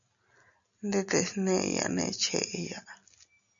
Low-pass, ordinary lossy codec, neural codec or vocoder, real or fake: 7.2 kHz; MP3, 96 kbps; none; real